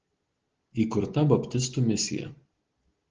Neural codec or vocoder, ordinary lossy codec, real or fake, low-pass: none; Opus, 16 kbps; real; 7.2 kHz